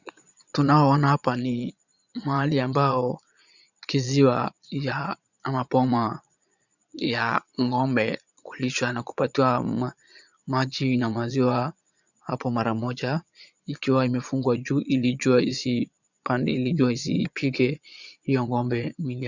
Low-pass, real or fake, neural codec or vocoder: 7.2 kHz; fake; vocoder, 22.05 kHz, 80 mel bands, Vocos